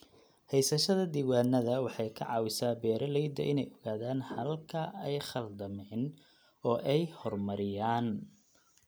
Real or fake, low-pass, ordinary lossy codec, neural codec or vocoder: real; none; none; none